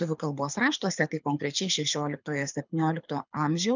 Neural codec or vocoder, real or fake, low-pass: codec, 24 kHz, 6 kbps, HILCodec; fake; 7.2 kHz